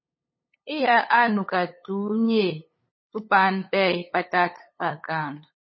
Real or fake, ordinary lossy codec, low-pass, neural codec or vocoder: fake; MP3, 24 kbps; 7.2 kHz; codec, 16 kHz, 8 kbps, FunCodec, trained on LibriTTS, 25 frames a second